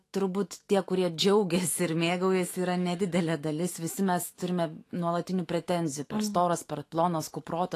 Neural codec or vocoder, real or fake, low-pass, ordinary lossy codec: autoencoder, 48 kHz, 128 numbers a frame, DAC-VAE, trained on Japanese speech; fake; 14.4 kHz; AAC, 48 kbps